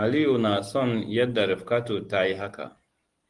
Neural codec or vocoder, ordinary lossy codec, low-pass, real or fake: none; Opus, 24 kbps; 10.8 kHz; real